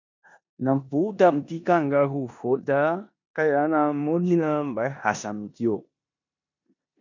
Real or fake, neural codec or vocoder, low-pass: fake; codec, 16 kHz in and 24 kHz out, 0.9 kbps, LongCat-Audio-Codec, four codebook decoder; 7.2 kHz